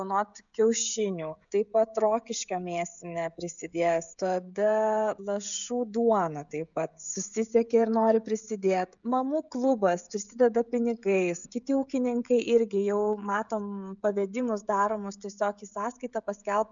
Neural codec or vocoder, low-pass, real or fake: codec, 16 kHz, 16 kbps, FreqCodec, smaller model; 7.2 kHz; fake